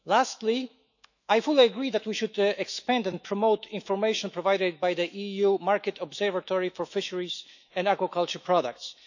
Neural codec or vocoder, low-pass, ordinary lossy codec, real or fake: autoencoder, 48 kHz, 128 numbers a frame, DAC-VAE, trained on Japanese speech; 7.2 kHz; none; fake